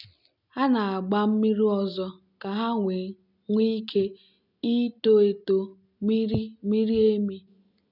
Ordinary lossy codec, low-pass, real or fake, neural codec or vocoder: none; 5.4 kHz; real; none